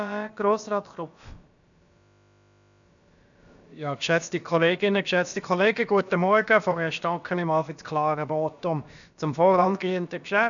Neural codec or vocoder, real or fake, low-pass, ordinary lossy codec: codec, 16 kHz, about 1 kbps, DyCAST, with the encoder's durations; fake; 7.2 kHz; none